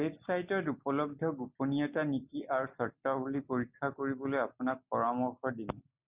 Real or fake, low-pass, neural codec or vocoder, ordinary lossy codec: real; 3.6 kHz; none; Opus, 64 kbps